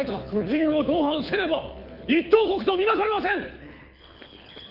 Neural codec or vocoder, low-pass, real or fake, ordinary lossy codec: codec, 24 kHz, 6 kbps, HILCodec; 5.4 kHz; fake; none